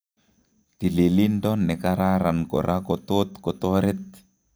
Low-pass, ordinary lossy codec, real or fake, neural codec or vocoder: none; none; real; none